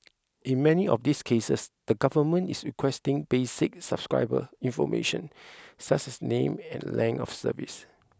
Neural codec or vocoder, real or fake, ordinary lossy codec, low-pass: none; real; none; none